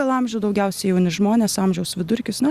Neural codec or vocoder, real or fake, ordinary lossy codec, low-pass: none; real; Opus, 64 kbps; 14.4 kHz